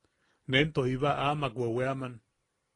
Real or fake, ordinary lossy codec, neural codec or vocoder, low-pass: real; AAC, 32 kbps; none; 10.8 kHz